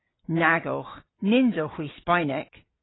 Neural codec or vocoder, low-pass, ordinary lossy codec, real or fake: none; 7.2 kHz; AAC, 16 kbps; real